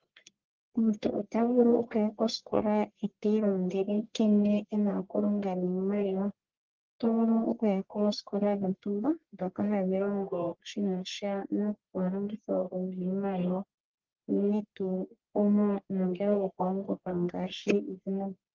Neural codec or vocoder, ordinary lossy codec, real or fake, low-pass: codec, 44.1 kHz, 1.7 kbps, Pupu-Codec; Opus, 16 kbps; fake; 7.2 kHz